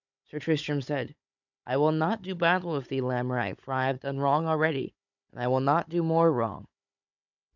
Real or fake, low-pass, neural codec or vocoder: fake; 7.2 kHz; codec, 16 kHz, 16 kbps, FunCodec, trained on Chinese and English, 50 frames a second